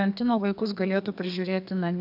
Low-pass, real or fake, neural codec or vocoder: 5.4 kHz; fake; codec, 32 kHz, 1.9 kbps, SNAC